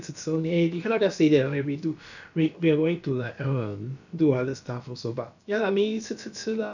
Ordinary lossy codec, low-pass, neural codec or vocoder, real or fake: none; 7.2 kHz; codec, 16 kHz, about 1 kbps, DyCAST, with the encoder's durations; fake